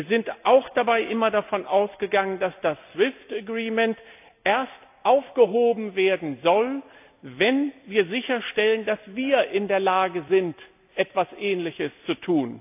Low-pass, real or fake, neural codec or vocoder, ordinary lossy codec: 3.6 kHz; real; none; AAC, 32 kbps